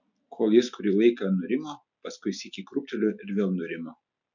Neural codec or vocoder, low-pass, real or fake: none; 7.2 kHz; real